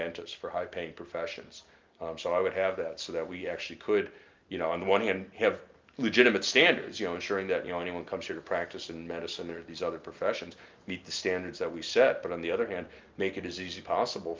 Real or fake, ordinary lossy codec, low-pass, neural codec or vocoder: real; Opus, 32 kbps; 7.2 kHz; none